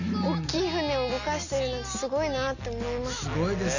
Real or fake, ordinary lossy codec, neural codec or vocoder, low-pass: real; none; none; 7.2 kHz